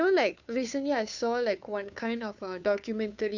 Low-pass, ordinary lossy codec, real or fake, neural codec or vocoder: 7.2 kHz; none; fake; codec, 16 kHz, 2 kbps, FunCodec, trained on Chinese and English, 25 frames a second